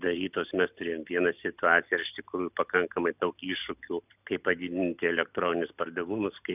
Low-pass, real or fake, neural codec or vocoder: 3.6 kHz; real; none